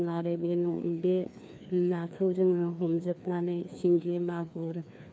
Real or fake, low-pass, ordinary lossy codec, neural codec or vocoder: fake; none; none; codec, 16 kHz, 2 kbps, FreqCodec, larger model